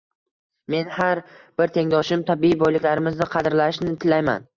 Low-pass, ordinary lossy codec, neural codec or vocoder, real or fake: 7.2 kHz; Opus, 64 kbps; none; real